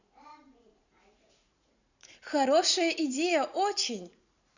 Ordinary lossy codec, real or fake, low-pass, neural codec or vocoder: none; fake; 7.2 kHz; vocoder, 44.1 kHz, 80 mel bands, Vocos